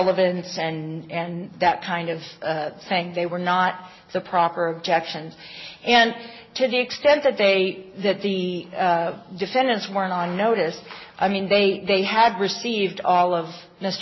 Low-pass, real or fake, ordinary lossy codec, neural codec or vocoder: 7.2 kHz; real; MP3, 24 kbps; none